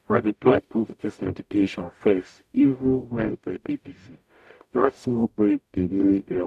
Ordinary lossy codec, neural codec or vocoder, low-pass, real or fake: none; codec, 44.1 kHz, 0.9 kbps, DAC; 14.4 kHz; fake